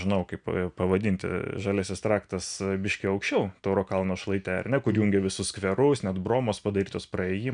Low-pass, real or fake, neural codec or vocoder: 9.9 kHz; real; none